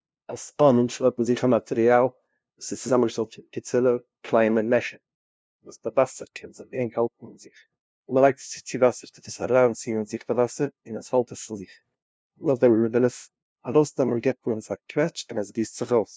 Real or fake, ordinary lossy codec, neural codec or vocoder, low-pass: fake; none; codec, 16 kHz, 0.5 kbps, FunCodec, trained on LibriTTS, 25 frames a second; none